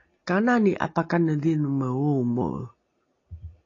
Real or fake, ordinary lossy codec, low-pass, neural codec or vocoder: real; AAC, 32 kbps; 7.2 kHz; none